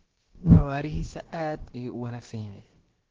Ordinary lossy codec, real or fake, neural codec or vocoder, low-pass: Opus, 16 kbps; fake; codec, 16 kHz, about 1 kbps, DyCAST, with the encoder's durations; 7.2 kHz